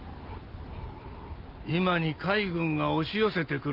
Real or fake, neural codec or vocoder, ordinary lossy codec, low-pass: real; none; Opus, 24 kbps; 5.4 kHz